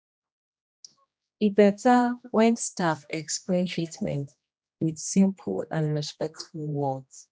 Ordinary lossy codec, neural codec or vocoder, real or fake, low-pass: none; codec, 16 kHz, 1 kbps, X-Codec, HuBERT features, trained on general audio; fake; none